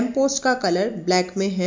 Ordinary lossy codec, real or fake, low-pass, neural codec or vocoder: MP3, 48 kbps; real; 7.2 kHz; none